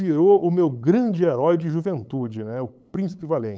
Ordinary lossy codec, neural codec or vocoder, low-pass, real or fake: none; codec, 16 kHz, 8 kbps, FunCodec, trained on LibriTTS, 25 frames a second; none; fake